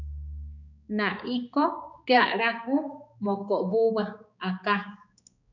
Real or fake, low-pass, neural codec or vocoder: fake; 7.2 kHz; codec, 16 kHz, 4 kbps, X-Codec, HuBERT features, trained on balanced general audio